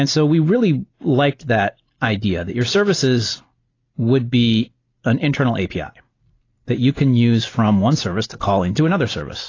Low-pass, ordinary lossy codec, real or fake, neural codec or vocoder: 7.2 kHz; AAC, 32 kbps; real; none